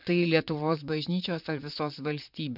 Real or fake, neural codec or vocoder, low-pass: fake; vocoder, 44.1 kHz, 128 mel bands, Pupu-Vocoder; 5.4 kHz